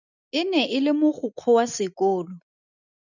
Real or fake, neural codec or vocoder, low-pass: real; none; 7.2 kHz